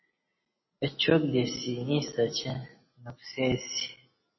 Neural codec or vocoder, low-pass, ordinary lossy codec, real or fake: none; 7.2 kHz; MP3, 24 kbps; real